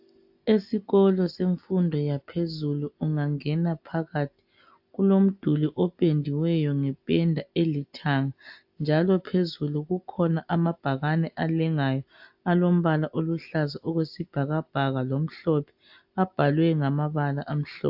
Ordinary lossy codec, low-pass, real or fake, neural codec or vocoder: AAC, 48 kbps; 5.4 kHz; real; none